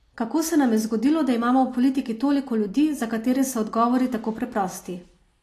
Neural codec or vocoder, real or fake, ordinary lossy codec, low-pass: none; real; AAC, 48 kbps; 14.4 kHz